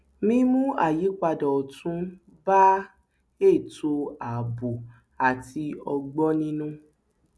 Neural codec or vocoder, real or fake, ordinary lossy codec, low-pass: none; real; none; none